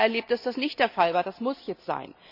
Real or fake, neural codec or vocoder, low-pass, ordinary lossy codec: real; none; 5.4 kHz; none